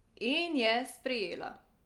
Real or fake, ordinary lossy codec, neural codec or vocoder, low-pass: real; Opus, 32 kbps; none; 19.8 kHz